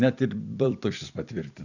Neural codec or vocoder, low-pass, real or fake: vocoder, 44.1 kHz, 128 mel bands every 256 samples, BigVGAN v2; 7.2 kHz; fake